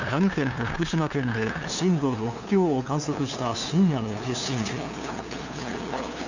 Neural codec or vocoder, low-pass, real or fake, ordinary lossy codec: codec, 16 kHz, 2 kbps, FunCodec, trained on LibriTTS, 25 frames a second; 7.2 kHz; fake; none